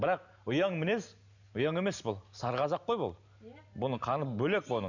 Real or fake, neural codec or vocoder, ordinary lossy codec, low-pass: real; none; none; 7.2 kHz